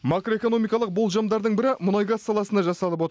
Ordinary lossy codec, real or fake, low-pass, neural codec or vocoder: none; real; none; none